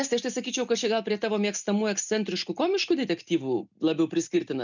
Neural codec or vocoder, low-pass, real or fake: none; 7.2 kHz; real